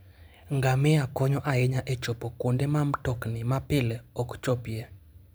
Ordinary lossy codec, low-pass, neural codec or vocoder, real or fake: none; none; vocoder, 44.1 kHz, 128 mel bands every 512 samples, BigVGAN v2; fake